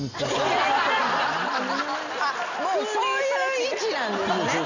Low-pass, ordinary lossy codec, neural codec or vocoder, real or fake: 7.2 kHz; none; none; real